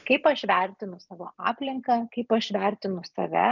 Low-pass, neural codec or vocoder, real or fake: 7.2 kHz; none; real